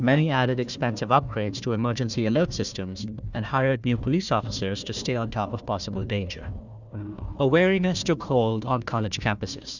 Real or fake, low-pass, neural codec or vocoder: fake; 7.2 kHz; codec, 16 kHz, 1 kbps, FunCodec, trained on Chinese and English, 50 frames a second